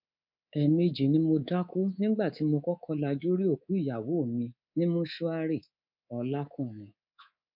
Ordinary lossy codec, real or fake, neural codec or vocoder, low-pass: none; fake; codec, 24 kHz, 3.1 kbps, DualCodec; 5.4 kHz